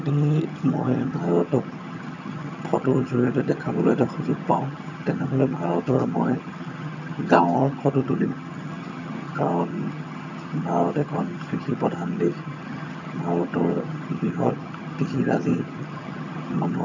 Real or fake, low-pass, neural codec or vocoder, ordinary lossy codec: fake; 7.2 kHz; vocoder, 22.05 kHz, 80 mel bands, HiFi-GAN; none